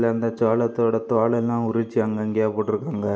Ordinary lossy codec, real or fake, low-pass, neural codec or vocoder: none; real; none; none